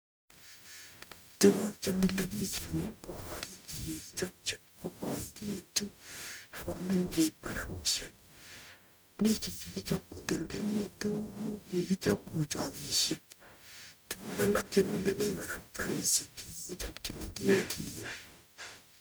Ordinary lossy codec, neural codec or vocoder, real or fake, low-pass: none; codec, 44.1 kHz, 0.9 kbps, DAC; fake; none